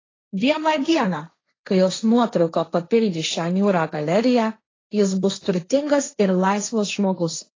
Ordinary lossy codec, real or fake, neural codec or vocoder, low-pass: AAC, 32 kbps; fake; codec, 16 kHz, 1.1 kbps, Voila-Tokenizer; 7.2 kHz